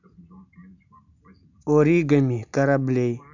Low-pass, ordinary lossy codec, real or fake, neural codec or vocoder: 7.2 kHz; none; real; none